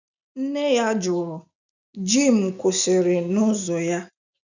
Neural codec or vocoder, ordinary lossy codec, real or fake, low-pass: none; none; real; 7.2 kHz